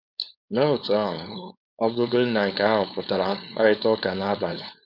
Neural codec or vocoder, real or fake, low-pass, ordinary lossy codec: codec, 16 kHz, 4.8 kbps, FACodec; fake; 5.4 kHz; none